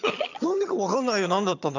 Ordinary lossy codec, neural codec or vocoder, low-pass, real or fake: none; vocoder, 22.05 kHz, 80 mel bands, HiFi-GAN; 7.2 kHz; fake